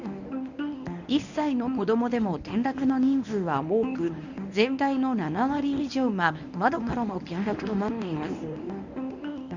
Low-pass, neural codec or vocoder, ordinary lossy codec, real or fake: 7.2 kHz; codec, 24 kHz, 0.9 kbps, WavTokenizer, medium speech release version 1; none; fake